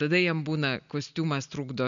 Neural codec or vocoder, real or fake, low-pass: none; real; 7.2 kHz